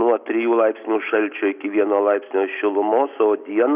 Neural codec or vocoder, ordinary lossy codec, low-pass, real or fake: none; Opus, 64 kbps; 3.6 kHz; real